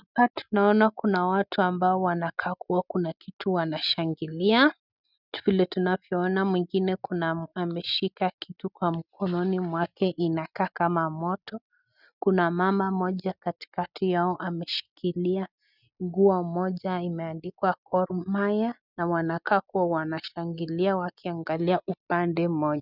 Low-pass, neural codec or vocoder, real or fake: 5.4 kHz; none; real